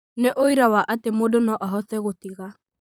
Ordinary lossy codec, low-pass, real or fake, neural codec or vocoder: none; none; fake; vocoder, 44.1 kHz, 128 mel bands, Pupu-Vocoder